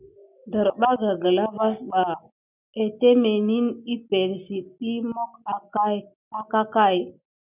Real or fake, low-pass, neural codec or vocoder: real; 3.6 kHz; none